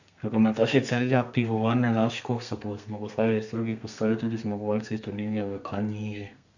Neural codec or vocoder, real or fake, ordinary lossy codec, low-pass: codec, 32 kHz, 1.9 kbps, SNAC; fake; none; 7.2 kHz